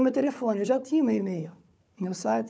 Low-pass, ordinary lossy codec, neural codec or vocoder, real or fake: none; none; codec, 16 kHz, 4 kbps, FunCodec, trained on Chinese and English, 50 frames a second; fake